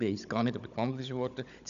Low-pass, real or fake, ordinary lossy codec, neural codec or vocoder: 7.2 kHz; fake; none; codec, 16 kHz, 16 kbps, FunCodec, trained on Chinese and English, 50 frames a second